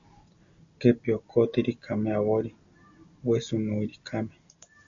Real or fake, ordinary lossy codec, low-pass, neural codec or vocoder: real; AAC, 64 kbps; 7.2 kHz; none